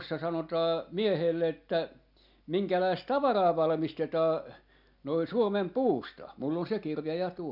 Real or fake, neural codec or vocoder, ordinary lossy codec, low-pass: real; none; none; 5.4 kHz